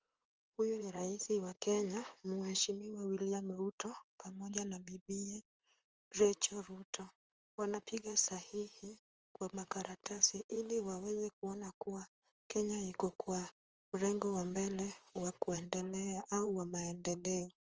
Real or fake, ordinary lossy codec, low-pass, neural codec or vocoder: fake; Opus, 24 kbps; 7.2 kHz; vocoder, 44.1 kHz, 128 mel bands, Pupu-Vocoder